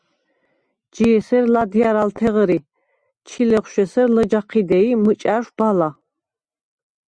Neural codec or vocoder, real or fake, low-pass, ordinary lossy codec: none; real; 9.9 kHz; Opus, 64 kbps